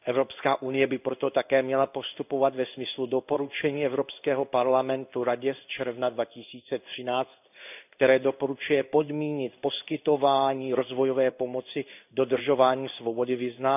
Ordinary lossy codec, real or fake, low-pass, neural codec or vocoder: none; fake; 3.6 kHz; codec, 16 kHz in and 24 kHz out, 1 kbps, XY-Tokenizer